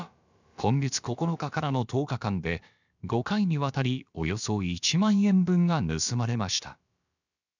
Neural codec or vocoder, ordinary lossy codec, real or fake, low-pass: codec, 16 kHz, about 1 kbps, DyCAST, with the encoder's durations; none; fake; 7.2 kHz